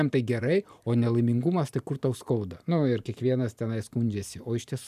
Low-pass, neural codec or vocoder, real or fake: 14.4 kHz; vocoder, 44.1 kHz, 128 mel bands every 512 samples, BigVGAN v2; fake